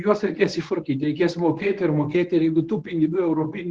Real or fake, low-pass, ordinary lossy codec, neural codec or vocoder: fake; 9.9 kHz; Opus, 16 kbps; codec, 24 kHz, 0.9 kbps, WavTokenizer, medium speech release version 1